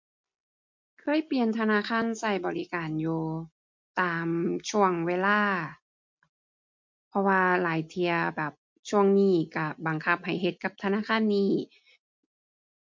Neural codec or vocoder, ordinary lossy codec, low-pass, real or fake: none; MP3, 48 kbps; 7.2 kHz; real